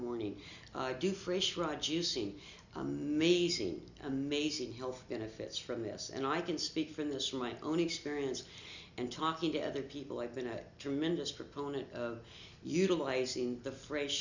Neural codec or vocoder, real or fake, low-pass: none; real; 7.2 kHz